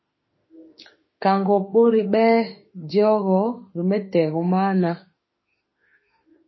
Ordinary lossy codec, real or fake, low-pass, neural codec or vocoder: MP3, 24 kbps; fake; 7.2 kHz; autoencoder, 48 kHz, 32 numbers a frame, DAC-VAE, trained on Japanese speech